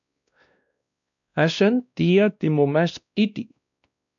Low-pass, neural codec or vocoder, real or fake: 7.2 kHz; codec, 16 kHz, 1 kbps, X-Codec, WavLM features, trained on Multilingual LibriSpeech; fake